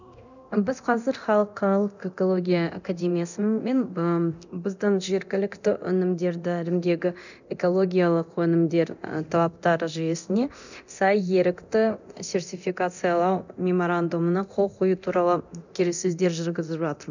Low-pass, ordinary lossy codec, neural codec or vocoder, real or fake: 7.2 kHz; none; codec, 24 kHz, 0.9 kbps, DualCodec; fake